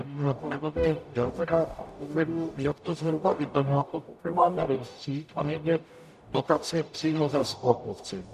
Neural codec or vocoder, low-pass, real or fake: codec, 44.1 kHz, 0.9 kbps, DAC; 14.4 kHz; fake